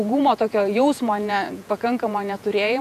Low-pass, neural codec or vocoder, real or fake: 14.4 kHz; vocoder, 48 kHz, 128 mel bands, Vocos; fake